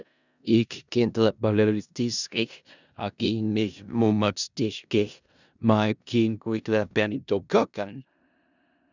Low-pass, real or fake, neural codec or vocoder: 7.2 kHz; fake; codec, 16 kHz in and 24 kHz out, 0.4 kbps, LongCat-Audio-Codec, four codebook decoder